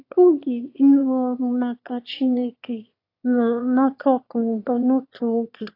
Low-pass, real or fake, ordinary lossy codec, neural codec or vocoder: 5.4 kHz; fake; AAC, 32 kbps; autoencoder, 22.05 kHz, a latent of 192 numbers a frame, VITS, trained on one speaker